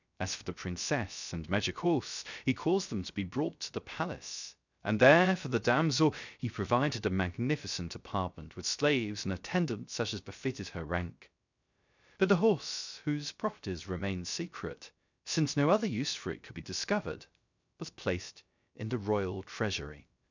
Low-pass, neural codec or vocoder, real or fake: 7.2 kHz; codec, 16 kHz, 0.3 kbps, FocalCodec; fake